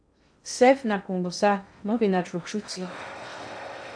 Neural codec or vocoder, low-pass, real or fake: codec, 16 kHz in and 24 kHz out, 0.6 kbps, FocalCodec, streaming, 2048 codes; 9.9 kHz; fake